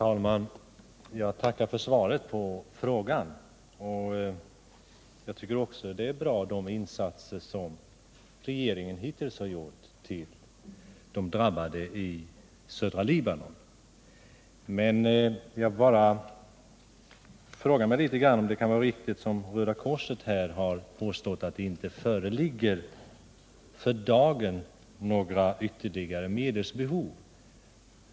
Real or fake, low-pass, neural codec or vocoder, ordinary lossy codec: real; none; none; none